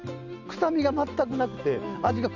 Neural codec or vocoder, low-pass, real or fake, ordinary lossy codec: none; 7.2 kHz; real; none